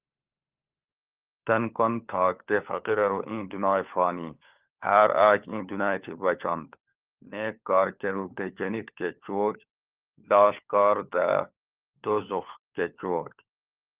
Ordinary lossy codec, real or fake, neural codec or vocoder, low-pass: Opus, 32 kbps; fake; codec, 16 kHz, 4 kbps, FunCodec, trained on LibriTTS, 50 frames a second; 3.6 kHz